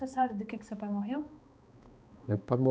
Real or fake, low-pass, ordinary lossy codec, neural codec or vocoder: fake; none; none; codec, 16 kHz, 2 kbps, X-Codec, HuBERT features, trained on balanced general audio